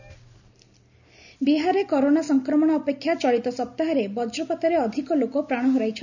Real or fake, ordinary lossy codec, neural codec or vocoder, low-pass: real; none; none; 7.2 kHz